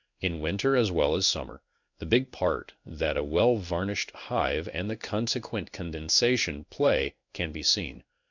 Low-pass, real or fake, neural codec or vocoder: 7.2 kHz; fake; codec, 16 kHz in and 24 kHz out, 1 kbps, XY-Tokenizer